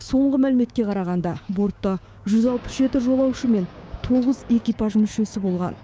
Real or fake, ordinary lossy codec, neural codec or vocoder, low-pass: fake; none; codec, 16 kHz, 6 kbps, DAC; none